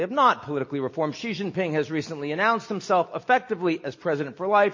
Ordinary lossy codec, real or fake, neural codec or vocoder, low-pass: MP3, 32 kbps; real; none; 7.2 kHz